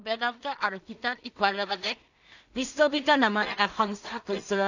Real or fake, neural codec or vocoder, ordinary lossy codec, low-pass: fake; codec, 16 kHz in and 24 kHz out, 0.4 kbps, LongCat-Audio-Codec, two codebook decoder; none; 7.2 kHz